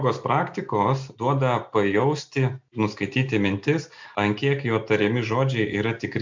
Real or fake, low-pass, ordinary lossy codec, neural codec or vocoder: real; 7.2 kHz; MP3, 64 kbps; none